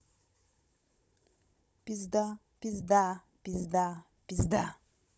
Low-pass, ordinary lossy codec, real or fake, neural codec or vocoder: none; none; fake; codec, 16 kHz, 16 kbps, FunCodec, trained on Chinese and English, 50 frames a second